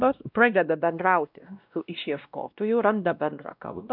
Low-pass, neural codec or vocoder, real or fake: 5.4 kHz; codec, 16 kHz, 1 kbps, X-Codec, WavLM features, trained on Multilingual LibriSpeech; fake